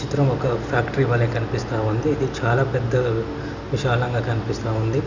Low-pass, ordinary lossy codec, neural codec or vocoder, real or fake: 7.2 kHz; none; none; real